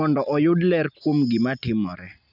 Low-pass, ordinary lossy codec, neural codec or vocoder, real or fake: 5.4 kHz; none; none; real